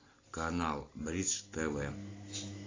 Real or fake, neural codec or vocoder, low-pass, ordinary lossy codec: real; none; 7.2 kHz; AAC, 32 kbps